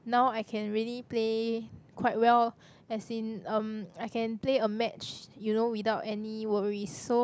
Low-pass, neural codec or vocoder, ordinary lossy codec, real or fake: none; none; none; real